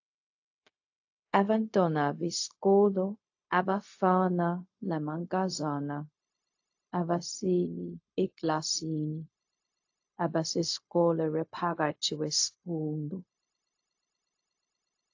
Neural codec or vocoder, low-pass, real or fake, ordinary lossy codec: codec, 16 kHz, 0.4 kbps, LongCat-Audio-Codec; 7.2 kHz; fake; AAC, 48 kbps